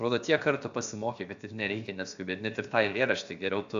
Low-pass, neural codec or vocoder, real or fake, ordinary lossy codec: 7.2 kHz; codec, 16 kHz, about 1 kbps, DyCAST, with the encoder's durations; fake; AAC, 96 kbps